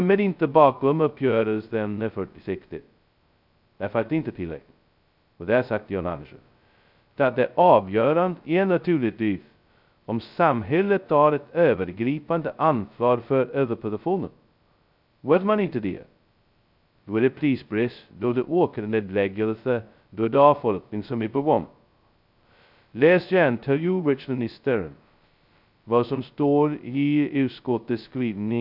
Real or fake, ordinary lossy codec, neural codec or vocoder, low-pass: fake; none; codec, 16 kHz, 0.2 kbps, FocalCodec; 5.4 kHz